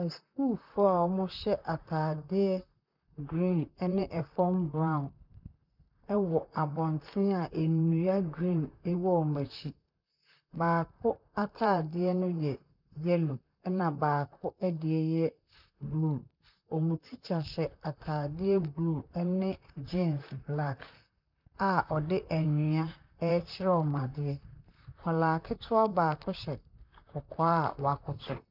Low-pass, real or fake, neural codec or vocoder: 5.4 kHz; fake; vocoder, 44.1 kHz, 128 mel bands, Pupu-Vocoder